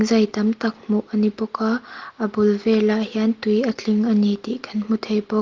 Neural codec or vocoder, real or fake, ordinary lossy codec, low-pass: none; real; Opus, 16 kbps; 7.2 kHz